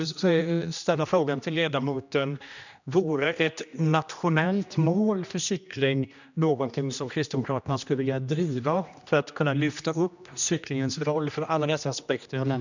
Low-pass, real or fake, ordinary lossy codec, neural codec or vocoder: 7.2 kHz; fake; none; codec, 16 kHz, 1 kbps, X-Codec, HuBERT features, trained on general audio